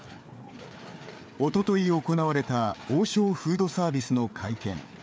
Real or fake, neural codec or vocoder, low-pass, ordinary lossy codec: fake; codec, 16 kHz, 4 kbps, FreqCodec, larger model; none; none